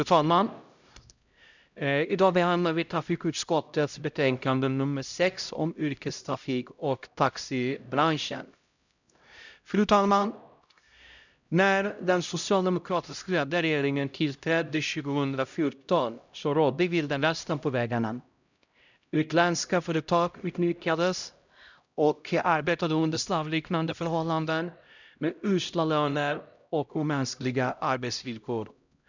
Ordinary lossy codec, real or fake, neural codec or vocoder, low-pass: none; fake; codec, 16 kHz, 0.5 kbps, X-Codec, HuBERT features, trained on LibriSpeech; 7.2 kHz